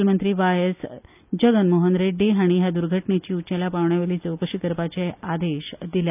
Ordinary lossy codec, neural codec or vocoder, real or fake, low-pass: none; none; real; 3.6 kHz